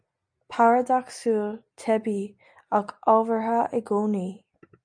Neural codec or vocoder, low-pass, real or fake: none; 9.9 kHz; real